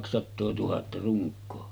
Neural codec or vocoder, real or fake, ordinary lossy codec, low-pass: none; real; none; none